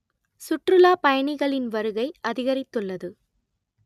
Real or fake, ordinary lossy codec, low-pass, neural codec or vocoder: real; none; 14.4 kHz; none